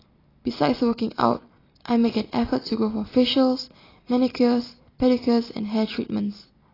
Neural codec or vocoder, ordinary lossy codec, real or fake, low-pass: none; AAC, 24 kbps; real; 5.4 kHz